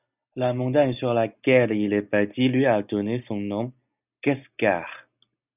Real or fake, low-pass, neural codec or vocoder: real; 3.6 kHz; none